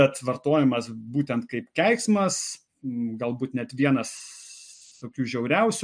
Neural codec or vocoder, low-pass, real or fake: none; 9.9 kHz; real